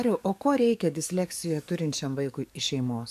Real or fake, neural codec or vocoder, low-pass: fake; codec, 44.1 kHz, 7.8 kbps, DAC; 14.4 kHz